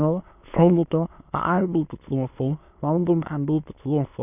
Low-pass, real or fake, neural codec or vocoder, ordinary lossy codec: 3.6 kHz; fake; autoencoder, 22.05 kHz, a latent of 192 numbers a frame, VITS, trained on many speakers; none